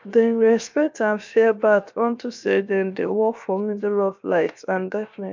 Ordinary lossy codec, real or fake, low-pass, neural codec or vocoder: MP3, 64 kbps; fake; 7.2 kHz; codec, 16 kHz, 0.7 kbps, FocalCodec